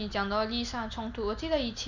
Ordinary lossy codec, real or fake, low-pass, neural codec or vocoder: none; real; 7.2 kHz; none